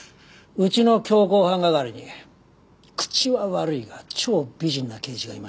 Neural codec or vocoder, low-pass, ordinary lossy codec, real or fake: none; none; none; real